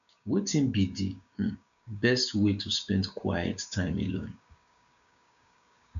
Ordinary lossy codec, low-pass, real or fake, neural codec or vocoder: none; 7.2 kHz; fake; codec, 16 kHz, 6 kbps, DAC